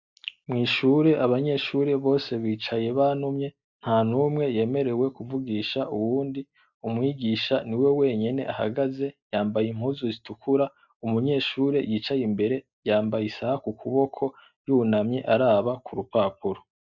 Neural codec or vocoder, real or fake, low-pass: none; real; 7.2 kHz